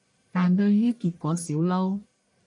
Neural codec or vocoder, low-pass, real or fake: codec, 44.1 kHz, 1.7 kbps, Pupu-Codec; 10.8 kHz; fake